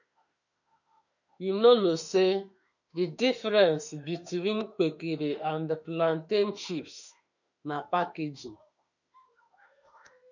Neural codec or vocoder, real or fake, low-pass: autoencoder, 48 kHz, 32 numbers a frame, DAC-VAE, trained on Japanese speech; fake; 7.2 kHz